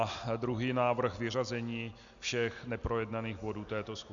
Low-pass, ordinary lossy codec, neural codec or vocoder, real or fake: 7.2 kHz; Opus, 64 kbps; none; real